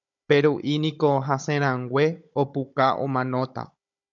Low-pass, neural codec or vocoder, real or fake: 7.2 kHz; codec, 16 kHz, 16 kbps, FunCodec, trained on Chinese and English, 50 frames a second; fake